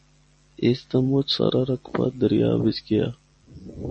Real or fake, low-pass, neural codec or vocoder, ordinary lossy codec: real; 10.8 kHz; none; MP3, 32 kbps